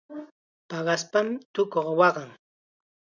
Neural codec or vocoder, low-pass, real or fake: none; 7.2 kHz; real